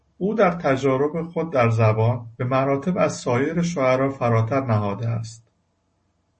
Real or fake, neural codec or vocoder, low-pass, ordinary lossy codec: real; none; 9.9 kHz; MP3, 32 kbps